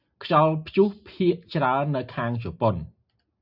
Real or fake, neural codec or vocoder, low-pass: real; none; 5.4 kHz